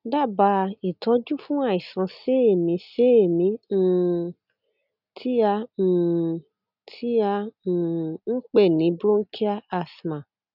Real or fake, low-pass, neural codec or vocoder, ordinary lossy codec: real; 5.4 kHz; none; none